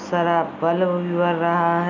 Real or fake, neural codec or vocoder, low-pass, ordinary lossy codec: real; none; 7.2 kHz; none